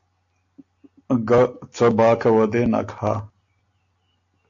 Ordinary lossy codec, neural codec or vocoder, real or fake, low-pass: AAC, 64 kbps; none; real; 7.2 kHz